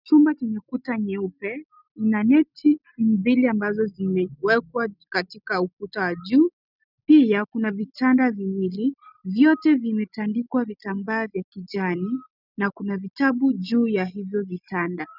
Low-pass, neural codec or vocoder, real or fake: 5.4 kHz; none; real